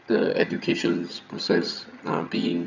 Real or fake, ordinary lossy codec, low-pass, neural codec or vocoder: fake; none; 7.2 kHz; vocoder, 22.05 kHz, 80 mel bands, HiFi-GAN